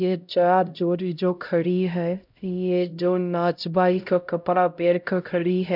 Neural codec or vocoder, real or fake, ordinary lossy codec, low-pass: codec, 16 kHz, 0.5 kbps, X-Codec, HuBERT features, trained on LibriSpeech; fake; none; 5.4 kHz